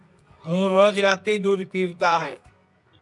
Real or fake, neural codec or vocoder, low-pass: fake; codec, 24 kHz, 0.9 kbps, WavTokenizer, medium music audio release; 10.8 kHz